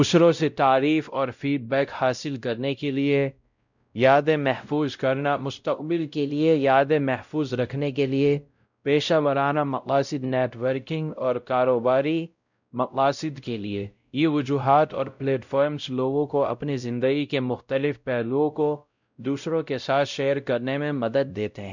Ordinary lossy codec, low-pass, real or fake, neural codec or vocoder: none; 7.2 kHz; fake; codec, 16 kHz, 0.5 kbps, X-Codec, WavLM features, trained on Multilingual LibriSpeech